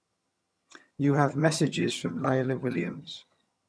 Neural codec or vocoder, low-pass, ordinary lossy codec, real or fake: vocoder, 22.05 kHz, 80 mel bands, HiFi-GAN; none; none; fake